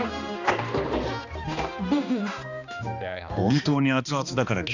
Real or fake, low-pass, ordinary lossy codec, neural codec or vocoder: fake; 7.2 kHz; none; codec, 16 kHz, 2 kbps, X-Codec, HuBERT features, trained on balanced general audio